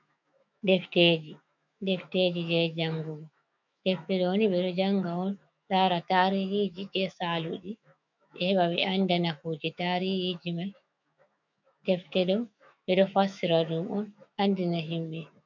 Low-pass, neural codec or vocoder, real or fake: 7.2 kHz; autoencoder, 48 kHz, 128 numbers a frame, DAC-VAE, trained on Japanese speech; fake